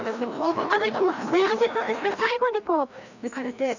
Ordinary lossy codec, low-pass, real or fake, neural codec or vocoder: none; 7.2 kHz; fake; codec, 16 kHz, 1 kbps, FreqCodec, larger model